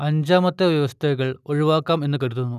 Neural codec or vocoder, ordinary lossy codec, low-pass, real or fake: none; none; 14.4 kHz; real